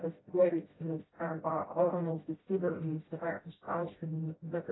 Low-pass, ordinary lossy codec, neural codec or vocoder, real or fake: 7.2 kHz; AAC, 16 kbps; codec, 16 kHz, 0.5 kbps, FreqCodec, smaller model; fake